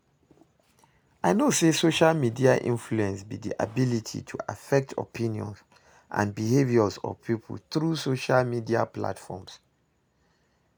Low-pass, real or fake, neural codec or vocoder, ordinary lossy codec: none; real; none; none